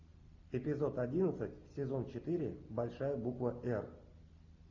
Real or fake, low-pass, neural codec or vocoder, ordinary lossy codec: real; 7.2 kHz; none; AAC, 48 kbps